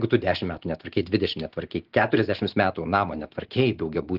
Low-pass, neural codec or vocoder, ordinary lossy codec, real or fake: 5.4 kHz; none; Opus, 16 kbps; real